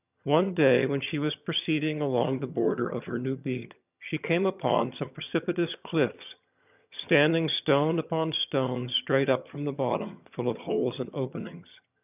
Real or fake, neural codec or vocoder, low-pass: fake; vocoder, 22.05 kHz, 80 mel bands, HiFi-GAN; 3.6 kHz